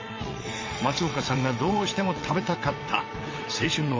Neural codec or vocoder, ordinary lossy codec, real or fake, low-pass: vocoder, 22.05 kHz, 80 mel bands, WaveNeXt; MP3, 32 kbps; fake; 7.2 kHz